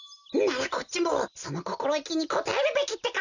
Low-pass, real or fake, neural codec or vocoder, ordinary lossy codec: 7.2 kHz; real; none; none